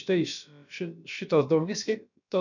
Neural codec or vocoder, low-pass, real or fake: codec, 16 kHz, about 1 kbps, DyCAST, with the encoder's durations; 7.2 kHz; fake